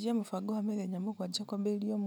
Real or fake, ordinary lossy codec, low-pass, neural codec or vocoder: real; none; none; none